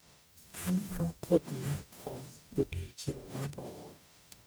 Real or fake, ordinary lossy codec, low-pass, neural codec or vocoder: fake; none; none; codec, 44.1 kHz, 0.9 kbps, DAC